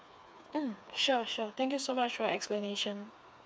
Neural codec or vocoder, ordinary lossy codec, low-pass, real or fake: codec, 16 kHz, 4 kbps, FreqCodec, smaller model; none; none; fake